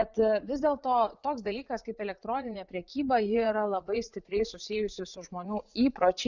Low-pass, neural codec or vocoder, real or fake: 7.2 kHz; vocoder, 44.1 kHz, 80 mel bands, Vocos; fake